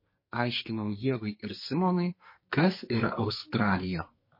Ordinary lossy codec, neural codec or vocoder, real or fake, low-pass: MP3, 24 kbps; codec, 44.1 kHz, 2.6 kbps, SNAC; fake; 5.4 kHz